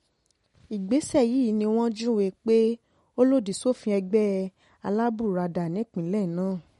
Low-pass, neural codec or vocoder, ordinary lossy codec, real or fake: 19.8 kHz; none; MP3, 48 kbps; real